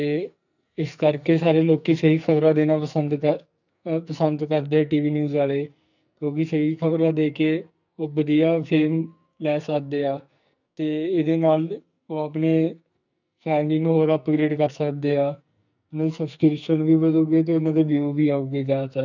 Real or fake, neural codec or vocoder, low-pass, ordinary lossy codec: fake; codec, 44.1 kHz, 2.6 kbps, SNAC; 7.2 kHz; none